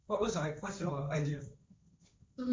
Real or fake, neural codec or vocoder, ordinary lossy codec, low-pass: fake; codec, 16 kHz, 1.1 kbps, Voila-Tokenizer; none; none